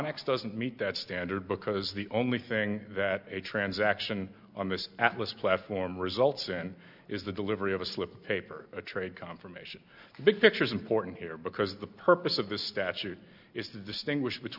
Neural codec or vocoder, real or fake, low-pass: none; real; 5.4 kHz